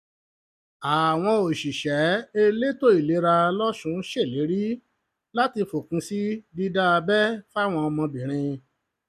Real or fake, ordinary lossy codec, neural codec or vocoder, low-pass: real; none; none; 14.4 kHz